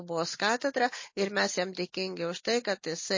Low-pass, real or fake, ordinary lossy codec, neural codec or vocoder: 7.2 kHz; real; MP3, 32 kbps; none